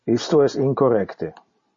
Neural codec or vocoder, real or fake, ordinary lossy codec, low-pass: none; real; MP3, 32 kbps; 7.2 kHz